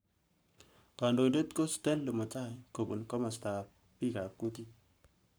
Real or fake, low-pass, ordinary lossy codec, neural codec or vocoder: fake; none; none; codec, 44.1 kHz, 7.8 kbps, Pupu-Codec